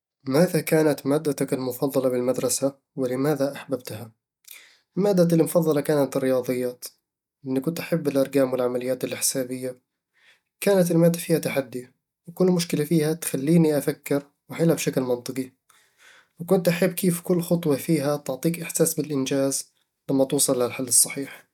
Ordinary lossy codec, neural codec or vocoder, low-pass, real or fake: none; none; 19.8 kHz; real